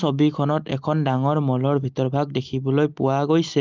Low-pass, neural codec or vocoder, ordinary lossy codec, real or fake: 7.2 kHz; none; Opus, 24 kbps; real